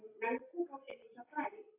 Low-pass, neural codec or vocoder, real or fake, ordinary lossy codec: 3.6 kHz; none; real; AAC, 32 kbps